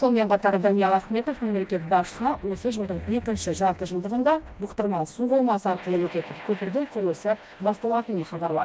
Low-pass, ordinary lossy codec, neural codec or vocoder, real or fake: none; none; codec, 16 kHz, 1 kbps, FreqCodec, smaller model; fake